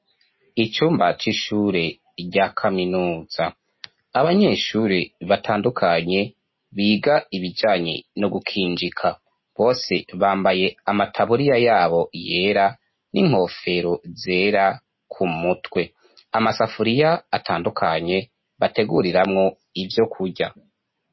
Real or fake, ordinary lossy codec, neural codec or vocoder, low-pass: real; MP3, 24 kbps; none; 7.2 kHz